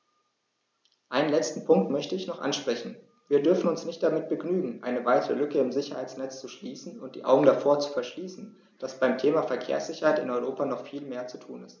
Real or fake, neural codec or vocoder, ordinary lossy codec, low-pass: real; none; none; none